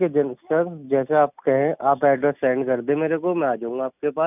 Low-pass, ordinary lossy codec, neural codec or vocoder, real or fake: 3.6 kHz; none; none; real